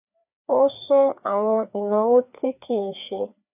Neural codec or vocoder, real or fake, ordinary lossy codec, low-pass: codec, 16 kHz, 2 kbps, FreqCodec, larger model; fake; none; 3.6 kHz